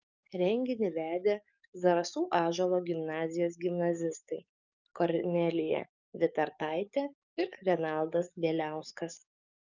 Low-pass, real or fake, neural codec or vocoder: 7.2 kHz; fake; codec, 44.1 kHz, 7.8 kbps, DAC